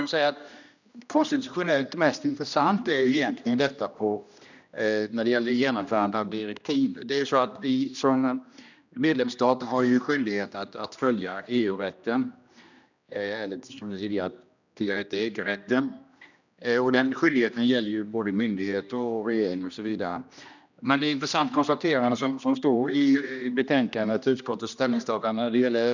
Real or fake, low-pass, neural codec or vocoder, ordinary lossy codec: fake; 7.2 kHz; codec, 16 kHz, 1 kbps, X-Codec, HuBERT features, trained on general audio; none